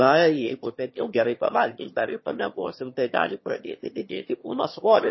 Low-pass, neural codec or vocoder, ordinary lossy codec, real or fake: 7.2 kHz; autoencoder, 22.05 kHz, a latent of 192 numbers a frame, VITS, trained on one speaker; MP3, 24 kbps; fake